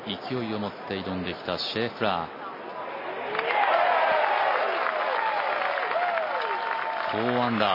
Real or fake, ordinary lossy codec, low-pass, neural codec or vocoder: real; MP3, 24 kbps; 5.4 kHz; none